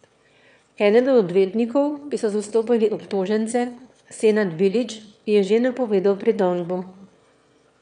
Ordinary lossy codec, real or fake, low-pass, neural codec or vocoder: none; fake; 9.9 kHz; autoencoder, 22.05 kHz, a latent of 192 numbers a frame, VITS, trained on one speaker